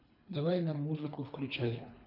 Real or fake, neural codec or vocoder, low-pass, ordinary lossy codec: fake; codec, 24 kHz, 3 kbps, HILCodec; 5.4 kHz; none